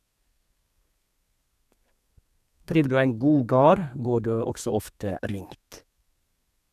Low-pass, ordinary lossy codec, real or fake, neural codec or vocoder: 14.4 kHz; none; fake; codec, 32 kHz, 1.9 kbps, SNAC